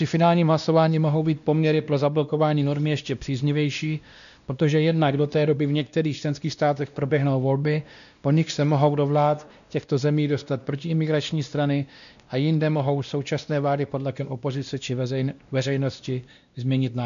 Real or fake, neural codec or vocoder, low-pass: fake; codec, 16 kHz, 1 kbps, X-Codec, WavLM features, trained on Multilingual LibriSpeech; 7.2 kHz